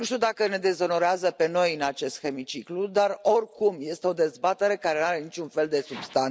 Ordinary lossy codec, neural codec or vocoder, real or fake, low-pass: none; none; real; none